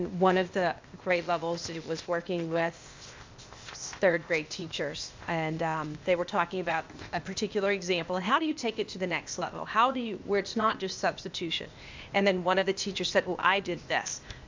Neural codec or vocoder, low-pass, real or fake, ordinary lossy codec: codec, 16 kHz, 0.8 kbps, ZipCodec; 7.2 kHz; fake; MP3, 64 kbps